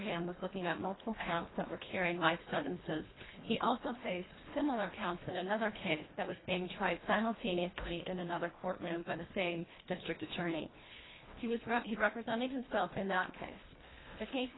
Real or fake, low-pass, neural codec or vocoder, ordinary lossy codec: fake; 7.2 kHz; codec, 24 kHz, 1.5 kbps, HILCodec; AAC, 16 kbps